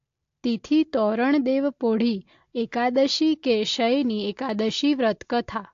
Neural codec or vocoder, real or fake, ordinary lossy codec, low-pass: none; real; AAC, 48 kbps; 7.2 kHz